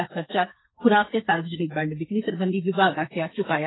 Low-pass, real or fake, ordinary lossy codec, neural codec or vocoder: 7.2 kHz; fake; AAC, 16 kbps; codec, 32 kHz, 1.9 kbps, SNAC